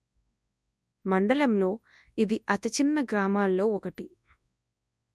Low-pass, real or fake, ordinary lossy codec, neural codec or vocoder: none; fake; none; codec, 24 kHz, 0.9 kbps, WavTokenizer, large speech release